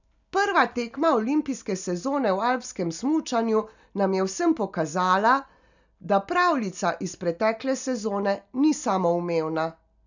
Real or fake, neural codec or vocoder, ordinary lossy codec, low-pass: real; none; none; 7.2 kHz